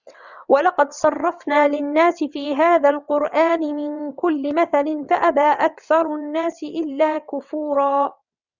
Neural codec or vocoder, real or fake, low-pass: vocoder, 22.05 kHz, 80 mel bands, WaveNeXt; fake; 7.2 kHz